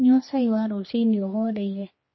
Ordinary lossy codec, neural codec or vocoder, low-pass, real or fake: MP3, 24 kbps; codec, 16 kHz, 2 kbps, X-Codec, HuBERT features, trained on general audio; 7.2 kHz; fake